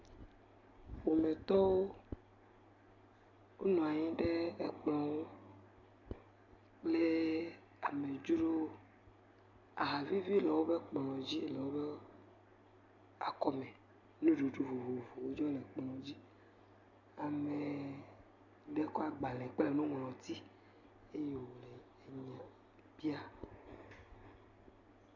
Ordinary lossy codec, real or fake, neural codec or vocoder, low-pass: MP3, 48 kbps; real; none; 7.2 kHz